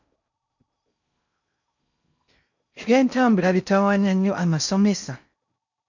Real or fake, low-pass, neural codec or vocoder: fake; 7.2 kHz; codec, 16 kHz in and 24 kHz out, 0.6 kbps, FocalCodec, streaming, 4096 codes